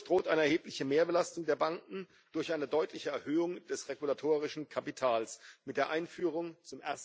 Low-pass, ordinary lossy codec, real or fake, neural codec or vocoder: none; none; real; none